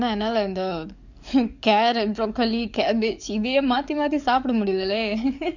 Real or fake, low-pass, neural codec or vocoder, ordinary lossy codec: fake; 7.2 kHz; codec, 44.1 kHz, 7.8 kbps, DAC; none